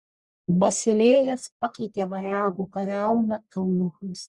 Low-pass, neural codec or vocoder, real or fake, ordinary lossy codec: 10.8 kHz; codec, 44.1 kHz, 1.7 kbps, Pupu-Codec; fake; Opus, 64 kbps